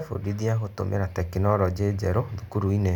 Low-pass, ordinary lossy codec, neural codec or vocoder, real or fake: 19.8 kHz; none; none; real